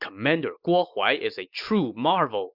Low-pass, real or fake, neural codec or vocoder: 5.4 kHz; real; none